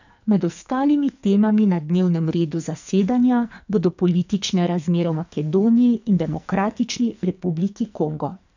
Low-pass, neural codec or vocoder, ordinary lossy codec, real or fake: 7.2 kHz; codec, 44.1 kHz, 2.6 kbps, SNAC; none; fake